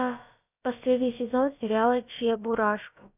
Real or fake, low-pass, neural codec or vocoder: fake; 3.6 kHz; codec, 16 kHz, about 1 kbps, DyCAST, with the encoder's durations